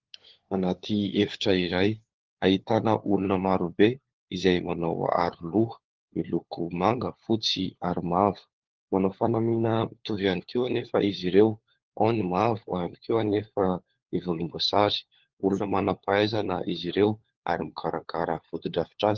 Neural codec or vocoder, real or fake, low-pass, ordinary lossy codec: codec, 16 kHz, 4 kbps, FunCodec, trained on LibriTTS, 50 frames a second; fake; 7.2 kHz; Opus, 16 kbps